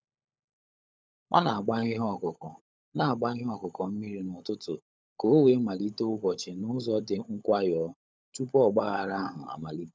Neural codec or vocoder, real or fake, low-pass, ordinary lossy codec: codec, 16 kHz, 16 kbps, FunCodec, trained on LibriTTS, 50 frames a second; fake; none; none